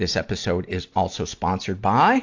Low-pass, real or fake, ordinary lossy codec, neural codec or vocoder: 7.2 kHz; real; AAC, 48 kbps; none